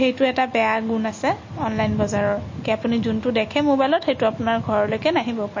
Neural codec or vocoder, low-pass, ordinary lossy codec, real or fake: none; 7.2 kHz; MP3, 32 kbps; real